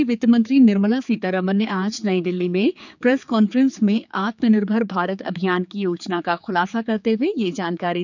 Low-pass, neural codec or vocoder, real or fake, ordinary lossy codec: 7.2 kHz; codec, 16 kHz, 4 kbps, X-Codec, HuBERT features, trained on general audio; fake; none